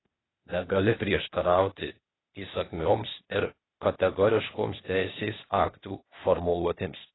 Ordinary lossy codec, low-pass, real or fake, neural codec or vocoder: AAC, 16 kbps; 7.2 kHz; fake; codec, 16 kHz, 0.8 kbps, ZipCodec